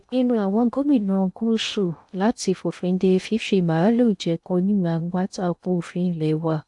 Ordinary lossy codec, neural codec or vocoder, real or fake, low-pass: AAC, 64 kbps; codec, 16 kHz in and 24 kHz out, 0.6 kbps, FocalCodec, streaming, 2048 codes; fake; 10.8 kHz